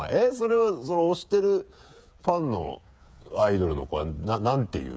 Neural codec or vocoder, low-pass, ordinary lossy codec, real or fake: codec, 16 kHz, 8 kbps, FreqCodec, smaller model; none; none; fake